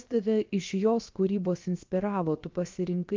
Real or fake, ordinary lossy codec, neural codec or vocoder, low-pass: fake; Opus, 32 kbps; codec, 16 kHz, 0.7 kbps, FocalCodec; 7.2 kHz